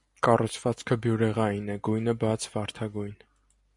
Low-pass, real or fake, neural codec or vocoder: 10.8 kHz; real; none